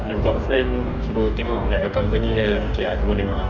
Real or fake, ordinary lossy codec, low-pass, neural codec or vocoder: fake; none; 7.2 kHz; codec, 44.1 kHz, 2.6 kbps, DAC